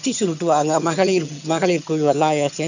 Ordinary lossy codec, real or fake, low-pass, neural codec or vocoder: none; fake; 7.2 kHz; vocoder, 22.05 kHz, 80 mel bands, HiFi-GAN